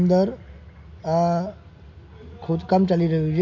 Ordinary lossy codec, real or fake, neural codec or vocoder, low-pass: MP3, 48 kbps; real; none; 7.2 kHz